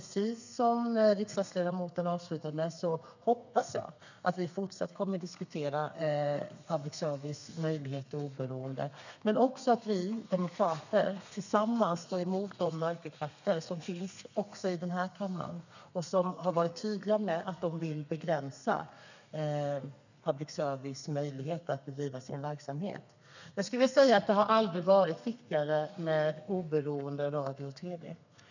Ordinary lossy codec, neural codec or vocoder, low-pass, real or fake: none; codec, 32 kHz, 1.9 kbps, SNAC; 7.2 kHz; fake